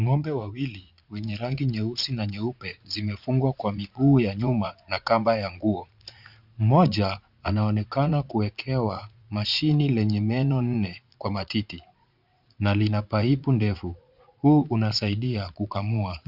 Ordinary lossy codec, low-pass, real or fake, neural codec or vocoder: Opus, 64 kbps; 5.4 kHz; fake; vocoder, 44.1 kHz, 80 mel bands, Vocos